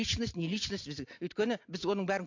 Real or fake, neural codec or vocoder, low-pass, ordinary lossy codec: real; none; 7.2 kHz; AAC, 48 kbps